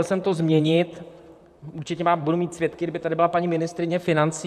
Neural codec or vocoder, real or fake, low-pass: vocoder, 44.1 kHz, 128 mel bands, Pupu-Vocoder; fake; 14.4 kHz